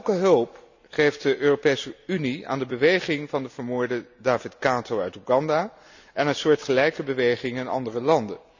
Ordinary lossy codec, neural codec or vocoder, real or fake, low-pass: none; none; real; 7.2 kHz